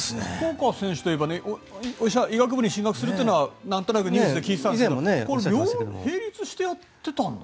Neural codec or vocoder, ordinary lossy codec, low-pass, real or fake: none; none; none; real